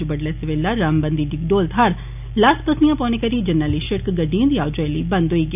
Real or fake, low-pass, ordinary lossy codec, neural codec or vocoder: real; 3.6 kHz; none; none